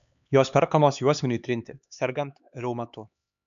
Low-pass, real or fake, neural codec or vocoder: 7.2 kHz; fake; codec, 16 kHz, 4 kbps, X-Codec, HuBERT features, trained on LibriSpeech